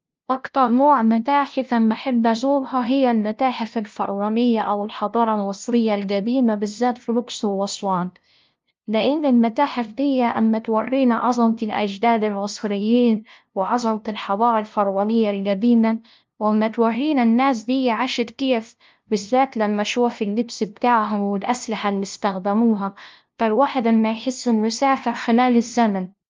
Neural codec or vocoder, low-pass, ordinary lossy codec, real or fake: codec, 16 kHz, 0.5 kbps, FunCodec, trained on LibriTTS, 25 frames a second; 7.2 kHz; Opus, 32 kbps; fake